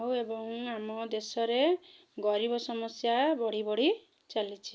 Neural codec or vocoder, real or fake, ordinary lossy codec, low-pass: none; real; none; none